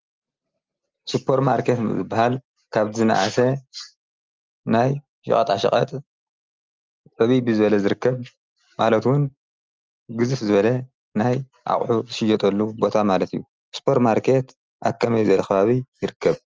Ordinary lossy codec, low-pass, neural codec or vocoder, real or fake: Opus, 24 kbps; 7.2 kHz; none; real